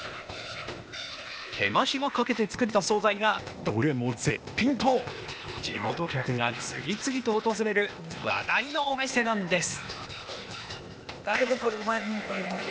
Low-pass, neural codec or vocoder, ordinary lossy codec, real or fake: none; codec, 16 kHz, 0.8 kbps, ZipCodec; none; fake